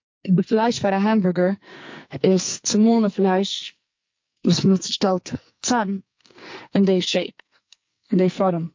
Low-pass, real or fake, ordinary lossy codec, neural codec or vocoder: 7.2 kHz; fake; MP3, 48 kbps; codec, 44.1 kHz, 2.6 kbps, SNAC